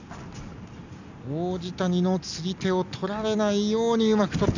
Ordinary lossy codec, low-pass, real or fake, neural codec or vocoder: none; 7.2 kHz; real; none